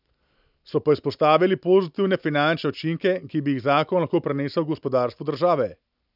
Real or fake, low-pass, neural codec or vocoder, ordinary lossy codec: real; 5.4 kHz; none; none